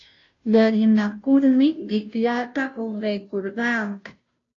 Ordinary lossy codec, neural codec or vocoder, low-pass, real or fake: AAC, 48 kbps; codec, 16 kHz, 0.5 kbps, FunCodec, trained on Chinese and English, 25 frames a second; 7.2 kHz; fake